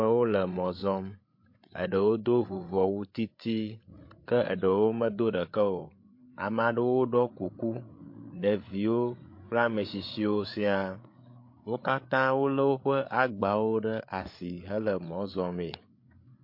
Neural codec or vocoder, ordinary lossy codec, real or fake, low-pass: codec, 16 kHz, 8 kbps, FreqCodec, larger model; MP3, 32 kbps; fake; 5.4 kHz